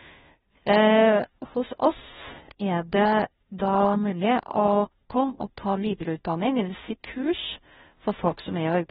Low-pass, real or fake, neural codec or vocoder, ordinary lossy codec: 7.2 kHz; fake; codec, 16 kHz, 0.5 kbps, FunCodec, trained on Chinese and English, 25 frames a second; AAC, 16 kbps